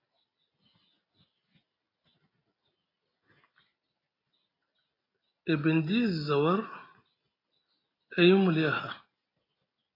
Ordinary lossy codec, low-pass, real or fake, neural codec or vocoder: AAC, 24 kbps; 5.4 kHz; real; none